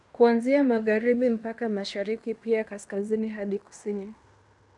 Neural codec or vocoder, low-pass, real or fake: codec, 16 kHz in and 24 kHz out, 0.9 kbps, LongCat-Audio-Codec, fine tuned four codebook decoder; 10.8 kHz; fake